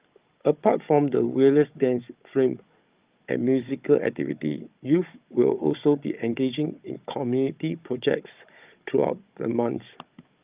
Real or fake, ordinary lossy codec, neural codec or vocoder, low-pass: fake; Opus, 24 kbps; codec, 16 kHz, 16 kbps, FunCodec, trained on Chinese and English, 50 frames a second; 3.6 kHz